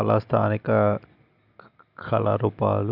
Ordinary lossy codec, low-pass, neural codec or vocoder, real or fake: none; 5.4 kHz; none; real